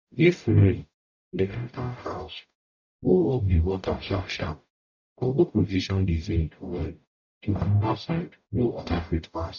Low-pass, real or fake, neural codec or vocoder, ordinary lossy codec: 7.2 kHz; fake; codec, 44.1 kHz, 0.9 kbps, DAC; none